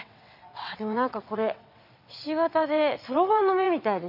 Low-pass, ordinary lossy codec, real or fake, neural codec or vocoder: 5.4 kHz; none; fake; vocoder, 22.05 kHz, 80 mel bands, WaveNeXt